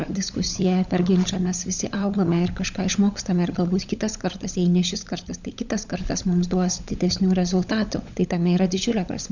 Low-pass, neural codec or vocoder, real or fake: 7.2 kHz; codec, 16 kHz, 16 kbps, FunCodec, trained on LibriTTS, 50 frames a second; fake